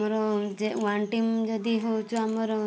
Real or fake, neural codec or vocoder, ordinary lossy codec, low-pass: real; none; none; none